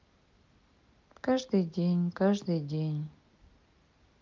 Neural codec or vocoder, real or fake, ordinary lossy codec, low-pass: none; real; Opus, 24 kbps; 7.2 kHz